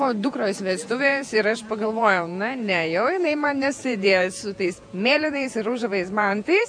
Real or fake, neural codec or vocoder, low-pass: fake; vocoder, 48 kHz, 128 mel bands, Vocos; 9.9 kHz